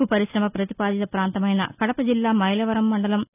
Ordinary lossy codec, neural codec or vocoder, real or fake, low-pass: none; none; real; 3.6 kHz